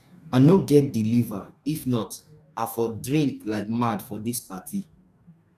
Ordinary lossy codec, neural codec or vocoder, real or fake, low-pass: none; codec, 44.1 kHz, 2.6 kbps, DAC; fake; 14.4 kHz